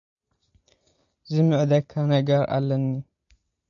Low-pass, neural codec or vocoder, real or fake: 7.2 kHz; none; real